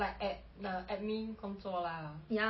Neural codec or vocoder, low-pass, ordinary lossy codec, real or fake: none; 7.2 kHz; MP3, 24 kbps; real